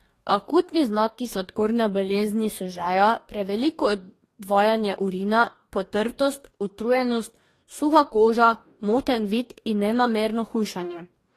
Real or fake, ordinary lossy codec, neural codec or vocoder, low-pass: fake; AAC, 48 kbps; codec, 44.1 kHz, 2.6 kbps, DAC; 14.4 kHz